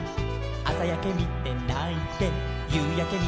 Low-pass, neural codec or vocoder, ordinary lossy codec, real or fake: none; none; none; real